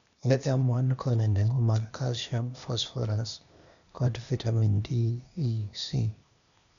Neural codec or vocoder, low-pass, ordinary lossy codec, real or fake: codec, 16 kHz, 0.8 kbps, ZipCodec; 7.2 kHz; none; fake